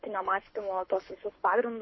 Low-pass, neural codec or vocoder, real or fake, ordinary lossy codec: 7.2 kHz; codec, 24 kHz, 6 kbps, HILCodec; fake; MP3, 24 kbps